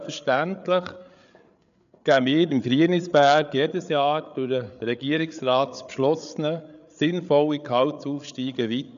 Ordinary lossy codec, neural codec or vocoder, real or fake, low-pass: none; codec, 16 kHz, 8 kbps, FreqCodec, larger model; fake; 7.2 kHz